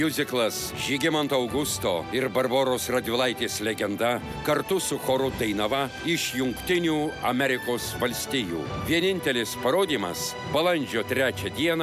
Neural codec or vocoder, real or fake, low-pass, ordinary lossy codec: none; real; 14.4 kHz; MP3, 96 kbps